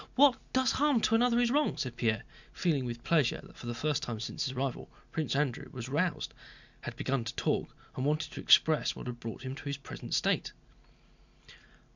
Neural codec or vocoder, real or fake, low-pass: none; real; 7.2 kHz